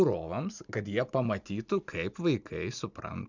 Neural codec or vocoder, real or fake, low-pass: none; real; 7.2 kHz